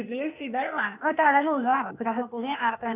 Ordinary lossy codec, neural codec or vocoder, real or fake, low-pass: none; codec, 16 kHz, 0.8 kbps, ZipCodec; fake; 3.6 kHz